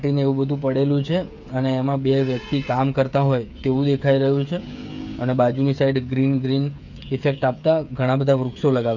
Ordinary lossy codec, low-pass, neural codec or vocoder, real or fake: none; 7.2 kHz; codec, 16 kHz, 8 kbps, FreqCodec, smaller model; fake